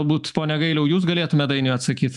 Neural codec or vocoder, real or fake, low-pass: autoencoder, 48 kHz, 128 numbers a frame, DAC-VAE, trained on Japanese speech; fake; 10.8 kHz